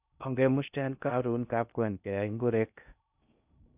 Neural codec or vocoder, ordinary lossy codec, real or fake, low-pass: codec, 16 kHz in and 24 kHz out, 0.6 kbps, FocalCodec, streaming, 2048 codes; none; fake; 3.6 kHz